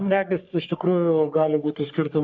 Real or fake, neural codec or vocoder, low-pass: fake; codec, 44.1 kHz, 3.4 kbps, Pupu-Codec; 7.2 kHz